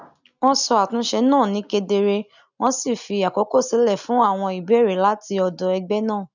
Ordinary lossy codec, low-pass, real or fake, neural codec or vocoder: none; 7.2 kHz; real; none